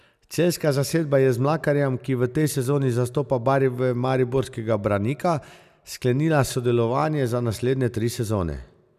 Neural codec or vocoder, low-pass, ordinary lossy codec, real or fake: none; 14.4 kHz; none; real